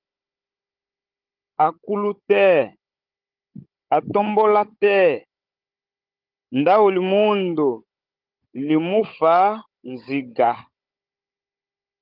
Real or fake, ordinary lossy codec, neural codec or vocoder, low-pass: fake; Opus, 24 kbps; codec, 16 kHz, 16 kbps, FunCodec, trained on Chinese and English, 50 frames a second; 5.4 kHz